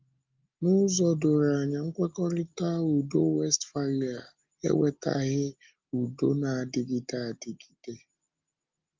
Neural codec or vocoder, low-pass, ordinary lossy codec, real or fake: none; 7.2 kHz; Opus, 24 kbps; real